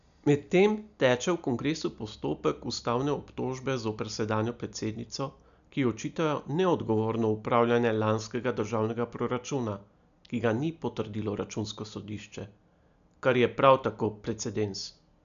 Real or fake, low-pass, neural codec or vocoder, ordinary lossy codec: real; 7.2 kHz; none; none